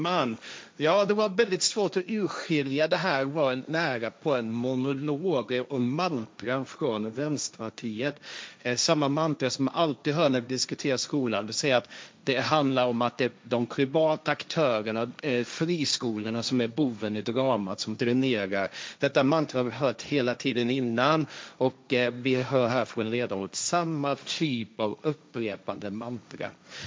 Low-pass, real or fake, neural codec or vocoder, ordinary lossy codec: none; fake; codec, 16 kHz, 1.1 kbps, Voila-Tokenizer; none